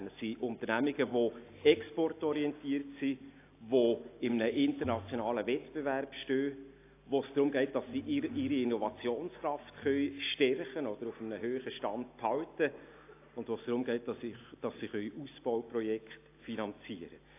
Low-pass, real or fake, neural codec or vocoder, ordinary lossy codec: 3.6 kHz; real; none; AAC, 24 kbps